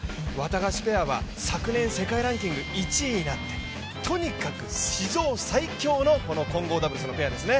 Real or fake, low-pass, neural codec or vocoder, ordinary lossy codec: real; none; none; none